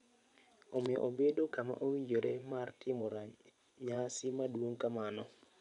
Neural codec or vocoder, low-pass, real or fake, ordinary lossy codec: vocoder, 24 kHz, 100 mel bands, Vocos; 10.8 kHz; fake; none